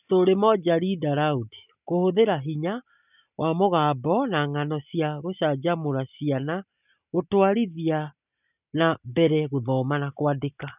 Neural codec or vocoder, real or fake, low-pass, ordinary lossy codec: none; real; 3.6 kHz; none